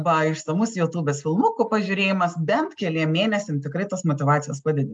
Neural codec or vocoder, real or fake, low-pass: none; real; 9.9 kHz